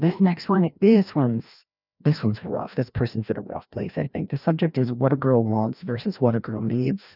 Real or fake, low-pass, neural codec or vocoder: fake; 5.4 kHz; codec, 16 kHz, 1 kbps, FreqCodec, larger model